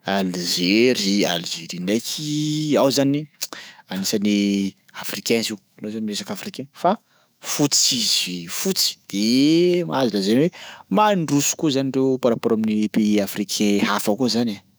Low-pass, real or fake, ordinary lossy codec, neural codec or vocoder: none; fake; none; autoencoder, 48 kHz, 128 numbers a frame, DAC-VAE, trained on Japanese speech